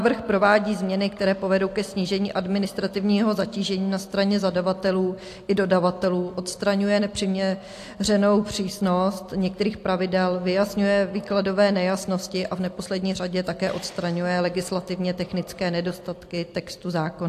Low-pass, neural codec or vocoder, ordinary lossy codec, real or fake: 14.4 kHz; none; AAC, 64 kbps; real